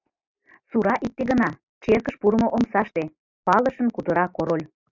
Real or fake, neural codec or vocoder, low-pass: real; none; 7.2 kHz